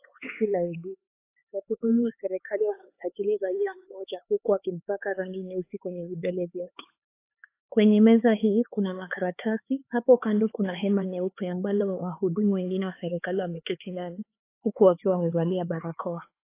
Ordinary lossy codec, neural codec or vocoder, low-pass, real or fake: AAC, 24 kbps; codec, 16 kHz, 4 kbps, X-Codec, HuBERT features, trained on LibriSpeech; 3.6 kHz; fake